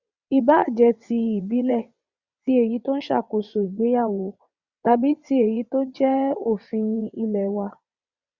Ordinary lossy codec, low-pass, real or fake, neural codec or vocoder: Opus, 64 kbps; 7.2 kHz; fake; vocoder, 22.05 kHz, 80 mel bands, WaveNeXt